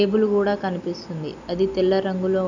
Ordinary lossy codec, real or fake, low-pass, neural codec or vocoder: none; real; 7.2 kHz; none